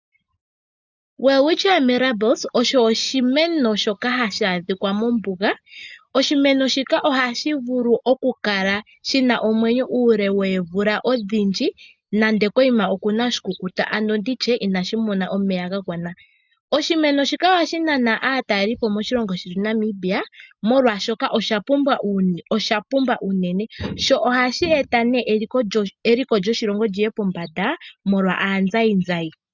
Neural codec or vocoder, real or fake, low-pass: none; real; 7.2 kHz